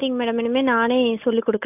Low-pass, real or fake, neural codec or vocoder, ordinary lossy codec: 3.6 kHz; real; none; AAC, 32 kbps